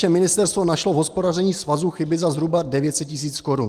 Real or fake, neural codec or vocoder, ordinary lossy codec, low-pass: real; none; Opus, 24 kbps; 14.4 kHz